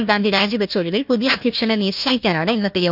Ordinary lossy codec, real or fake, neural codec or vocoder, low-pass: none; fake; codec, 16 kHz, 1 kbps, FunCodec, trained on Chinese and English, 50 frames a second; 5.4 kHz